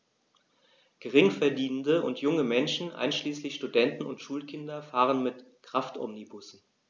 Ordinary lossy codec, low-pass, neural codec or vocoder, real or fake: none; none; none; real